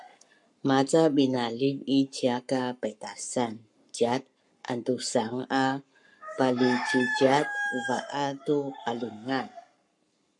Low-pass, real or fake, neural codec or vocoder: 10.8 kHz; fake; codec, 44.1 kHz, 7.8 kbps, Pupu-Codec